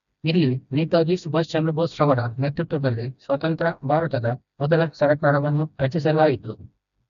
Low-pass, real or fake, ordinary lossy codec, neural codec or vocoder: 7.2 kHz; fake; none; codec, 16 kHz, 1 kbps, FreqCodec, smaller model